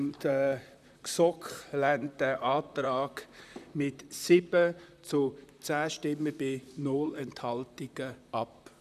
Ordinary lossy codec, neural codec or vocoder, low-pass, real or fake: none; vocoder, 44.1 kHz, 128 mel bands, Pupu-Vocoder; 14.4 kHz; fake